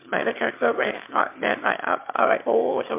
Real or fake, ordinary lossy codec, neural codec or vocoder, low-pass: fake; MP3, 32 kbps; autoencoder, 22.05 kHz, a latent of 192 numbers a frame, VITS, trained on one speaker; 3.6 kHz